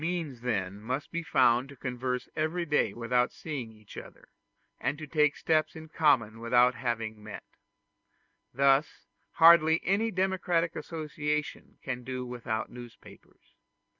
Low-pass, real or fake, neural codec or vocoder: 7.2 kHz; real; none